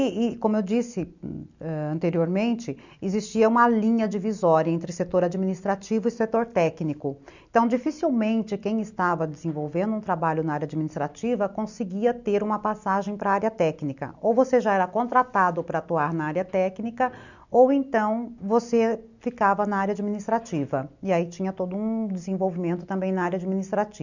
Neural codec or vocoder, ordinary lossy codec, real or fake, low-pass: none; none; real; 7.2 kHz